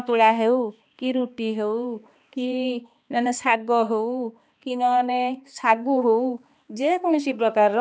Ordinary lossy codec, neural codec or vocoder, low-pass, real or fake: none; codec, 16 kHz, 2 kbps, X-Codec, HuBERT features, trained on balanced general audio; none; fake